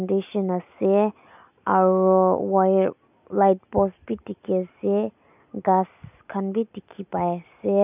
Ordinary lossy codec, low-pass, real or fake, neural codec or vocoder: none; 3.6 kHz; real; none